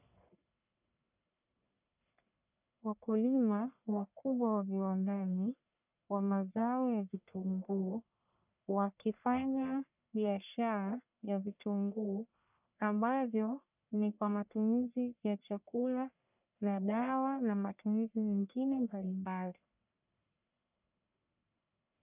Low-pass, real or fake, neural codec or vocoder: 3.6 kHz; fake; codec, 44.1 kHz, 1.7 kbps, Pupu-Codec